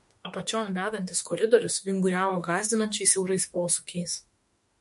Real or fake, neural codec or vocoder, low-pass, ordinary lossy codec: fake; autoencoder, 48 kHz, 32 numbers a frame, DAC-VAE, trained on Japanese speech; 14.4 kHz; MP3, 48 kbps